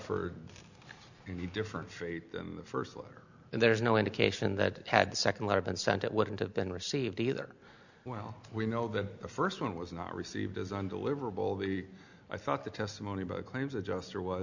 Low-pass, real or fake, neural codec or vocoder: 7.2 kHz; real; none